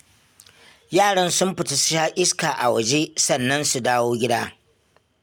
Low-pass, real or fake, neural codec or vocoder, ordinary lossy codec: none; real; none; none